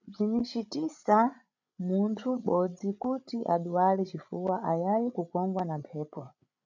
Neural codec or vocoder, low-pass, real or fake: codec, 16 kHz, 16 kbps, FreqCodec, larger model; 7.2 kHz; fake